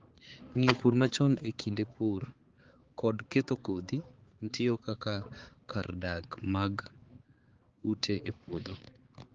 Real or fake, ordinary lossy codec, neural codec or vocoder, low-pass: fake; Opus, 16 kbps; codec, 16 kHz, 4 kbps, X-Codec, HuBERT features, trained on balanced general audio; 7.2 kHz